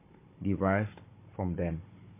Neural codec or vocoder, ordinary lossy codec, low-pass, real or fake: codec, 16 kHz, 16 kbps, FunCodec, trained on Chinese and English, 50 frames a second; MP3, 24 kbps; 3.6 kHz; fake